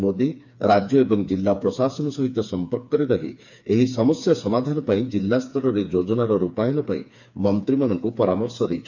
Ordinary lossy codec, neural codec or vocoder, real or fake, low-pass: none; codec, 16 kHz, 4 kbps, FreqCodec, smaller model; fake; 7.2 kHz